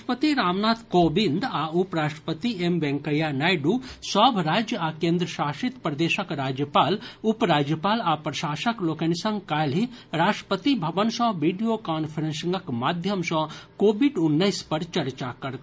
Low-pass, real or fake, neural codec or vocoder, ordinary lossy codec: none; real; none; none